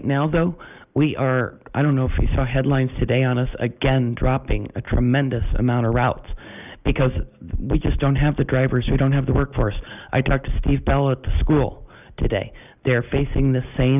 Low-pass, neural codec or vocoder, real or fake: 3.6 kHz; none; real